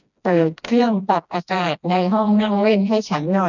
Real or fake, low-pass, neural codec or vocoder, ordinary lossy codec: fake; 7.2 kHz; codec, 16 kHz, 1 kbps, FreqCodec, smaller model; none